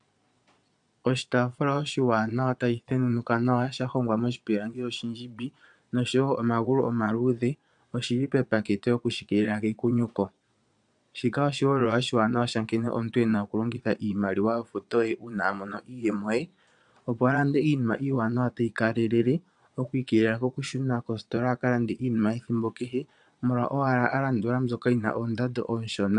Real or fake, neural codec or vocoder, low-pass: fake; vocoder, 22.05 kHz, 80 mel bands, Vocos; 9.9 kHz